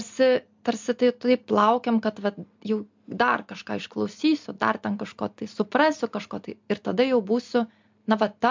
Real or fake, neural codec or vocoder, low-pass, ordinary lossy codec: real; none; 7.2 kHz; MP3, 64 kbps